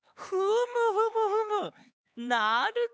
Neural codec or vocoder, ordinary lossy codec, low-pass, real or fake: codec, 16 kHz, 4 kbps, X-Codec, HuBERT features, trained on LibriSpeech; none; none; fake